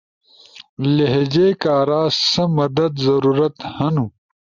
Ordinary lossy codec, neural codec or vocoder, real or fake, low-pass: Opus, 64 kbps; none; real; 7.2 kHz